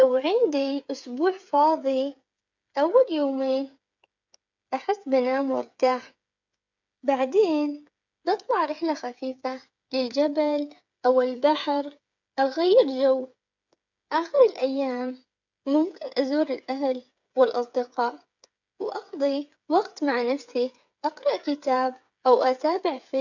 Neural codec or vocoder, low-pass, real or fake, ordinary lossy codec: codec, 16 kHz, 8 kbps, FreqCodec, smaller model; 7.2 kHz; fake; none